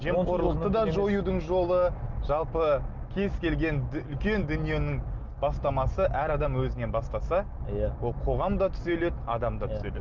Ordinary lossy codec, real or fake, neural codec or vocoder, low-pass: Opus, 24 kbps; real; none; 7.2 kHz